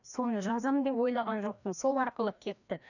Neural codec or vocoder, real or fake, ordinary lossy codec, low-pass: codec, 16 kHz, 1 kbps, FreqCodec, larger model; fake; none; 7.2 kHz